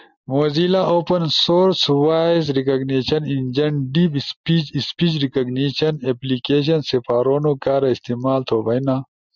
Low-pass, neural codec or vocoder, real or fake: 7.2 kHz; none; real